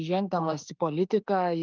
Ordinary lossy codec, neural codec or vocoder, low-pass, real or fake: Opus, 32 kbps; autoencoder, 48 kHz, 32 numbers a frame, DAC-VAE, trained on Japanese speech; 7.2 kHz; fake